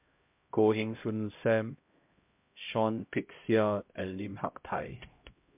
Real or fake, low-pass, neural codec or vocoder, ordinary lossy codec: fake; 3.6 kHz; codec, 16 kHz, 0.5 kbps, X-Codec, HuBERT features, trained on LibriSpeech; MP3, 32 kbps